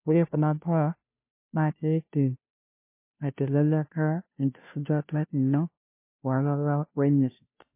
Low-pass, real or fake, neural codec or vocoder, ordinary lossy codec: 3.6 kHz; fake; codec, 16 kHz, 0.5 kbps, FunCodec, trained on Chinese and English, 25 frames a second; MP3, 24 kbps